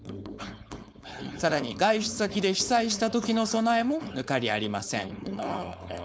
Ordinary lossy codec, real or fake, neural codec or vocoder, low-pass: none; fake; codec, 16 kHz, 4.8 kbps, FACodec; none